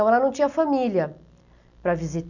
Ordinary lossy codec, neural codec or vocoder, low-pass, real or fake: none; none; 7.2 kHz; real